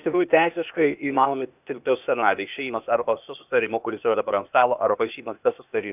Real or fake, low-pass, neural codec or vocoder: fake; 3.6 kHz; codec, 16 kHz, 0.8 kbps, ZipCodec